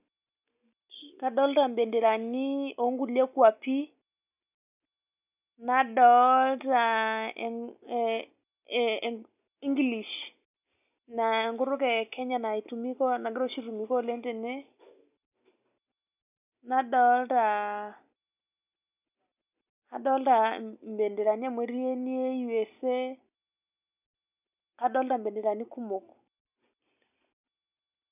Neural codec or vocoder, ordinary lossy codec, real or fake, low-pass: none; none; real; 3.6 kHz